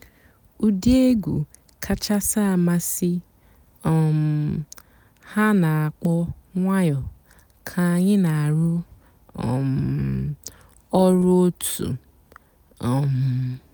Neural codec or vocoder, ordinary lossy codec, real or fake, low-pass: none; none; real; none